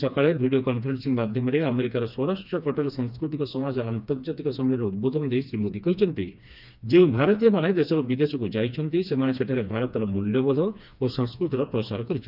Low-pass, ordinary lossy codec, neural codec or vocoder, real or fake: 5.4 kHz; none; codec, 16 kHz, 2 kbps, FreqCodec, smaller model; fake